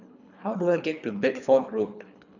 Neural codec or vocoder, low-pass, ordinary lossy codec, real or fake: codec, 24 kHz, 3 kbps, HILCodec; 7.2 kHz; none; fake